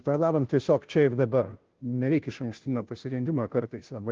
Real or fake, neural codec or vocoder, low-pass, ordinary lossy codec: fake; codec, 16 kHz, 0.5 kbps, FunCodec, trained on Chinese and English, 25 frames a second; 7.2 kHz; Opus, 16 kbps